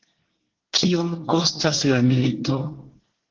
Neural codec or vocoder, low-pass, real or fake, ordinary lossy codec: codec, 24 kHz, 1 kbps, SNAC; 7.2 kHz; fake; Opus, 16 kbps